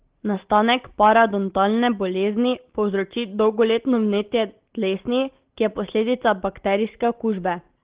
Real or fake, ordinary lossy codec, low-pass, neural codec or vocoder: real; Opus, 16 kbps; 3.6 kHz; none